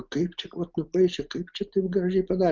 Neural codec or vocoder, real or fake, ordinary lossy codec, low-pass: none; real; Opus, 24 kbps; 7.2 kHz